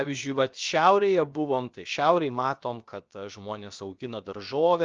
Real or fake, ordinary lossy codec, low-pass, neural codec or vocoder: fake; Opus, 24 kbps; 7.2 kHz; codec, 16 kHz, about 1 kbps, DyCAST, with the encoder's durations